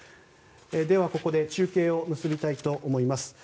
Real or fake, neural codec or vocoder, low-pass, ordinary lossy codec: real; none; none; none